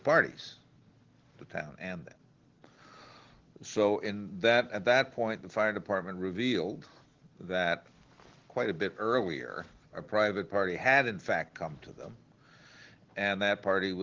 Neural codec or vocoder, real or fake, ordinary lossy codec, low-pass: none; real; Opus, 16 kbps; 7.2 kHz